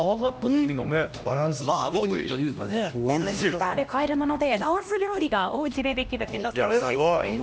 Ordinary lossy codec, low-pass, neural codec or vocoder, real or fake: none; none; codec, 16 kHz, 1 kbps, X-Codec, HuBERT features, trained on LibriSpeech; fake